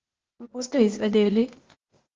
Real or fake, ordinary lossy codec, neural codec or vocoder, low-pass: fake; Opus, 16 kbps; codec, 16 kHz, 0.8 kbps, ZipCodec; 7.2 kHz